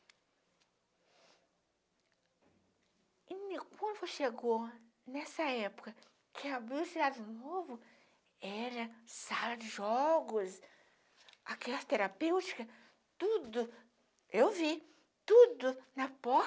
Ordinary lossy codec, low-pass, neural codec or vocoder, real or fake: none; none; none; real